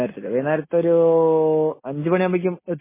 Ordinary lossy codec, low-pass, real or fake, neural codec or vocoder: MP3, 16 kbps; 3.6 kHz; real; none